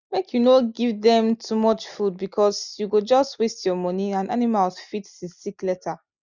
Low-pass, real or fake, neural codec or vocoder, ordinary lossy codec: 7.2 kHz; real; none; none